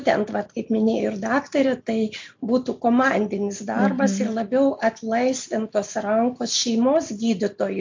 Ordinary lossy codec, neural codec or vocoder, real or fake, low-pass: MP3, 48 kbps; none; real; 7.2 kHz